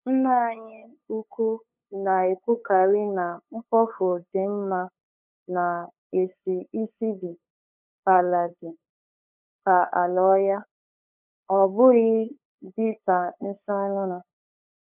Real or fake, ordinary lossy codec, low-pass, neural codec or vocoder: fake; none; 3.6 kHz; codec, 16 kHz, 8 kbps, FunCodec, trained on LibriTTS, 25 frames a second